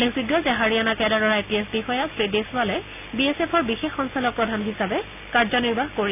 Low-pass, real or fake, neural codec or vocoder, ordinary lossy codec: 3.6 kHz; real; none; none